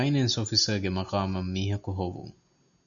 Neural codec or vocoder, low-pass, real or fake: none; 7.2 kHz; real